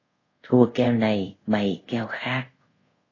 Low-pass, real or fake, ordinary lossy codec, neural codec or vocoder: 7.2 kHz; fake; AAC, 32 kbps; codec, 24 kHz, 0.5 kbps, DualCodec